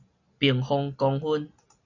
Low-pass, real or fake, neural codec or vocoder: 7.2 kHz; real; none